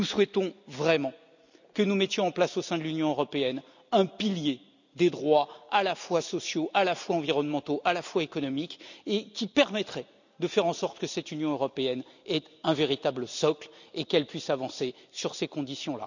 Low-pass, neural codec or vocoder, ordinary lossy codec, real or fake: 7.2 kHz; none; none; real